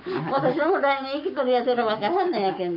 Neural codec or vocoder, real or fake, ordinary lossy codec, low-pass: codec, 44.1 kHz, 7.8 kbps, Pupu-Codec; fake; none; 5.4 kHz